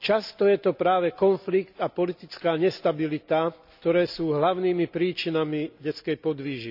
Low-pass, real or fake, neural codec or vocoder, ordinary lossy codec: 5.4 kHz; real; none; none